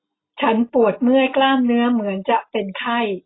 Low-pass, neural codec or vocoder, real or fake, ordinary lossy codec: 7.2 kHz; none; real; AAC, 16 kbps